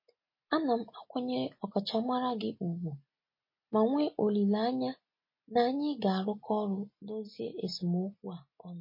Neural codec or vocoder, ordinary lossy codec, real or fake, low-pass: none; MP3, 24 kbps; real; 5.4 kHz